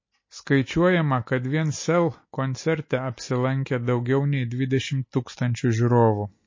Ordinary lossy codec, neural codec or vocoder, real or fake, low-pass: MP3, 32 kbps; none; real; 7.2 kHz